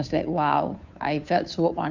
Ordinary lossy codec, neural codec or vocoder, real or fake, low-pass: Opus, 64 kbps; codec, 16 kHz, 2 kbps, FunCodec, trained on Chinese and English, 25 frames a second; fake; 7.2 kHz